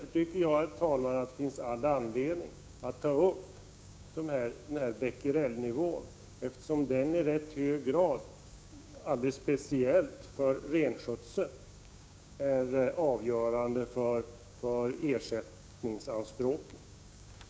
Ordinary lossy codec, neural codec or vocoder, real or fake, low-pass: none; codec, 16 kHz, 6 kbps, DAC; fake; none